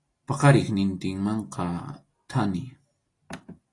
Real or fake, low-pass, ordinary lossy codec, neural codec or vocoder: real; 10.8 kHz; AAC, 32 kbps; none